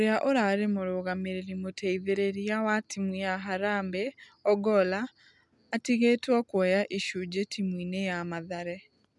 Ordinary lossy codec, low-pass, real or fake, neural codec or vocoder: none; 10.8 kHz; real; none